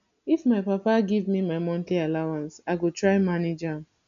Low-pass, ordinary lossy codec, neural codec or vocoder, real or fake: 7.2 kHz; none; none; real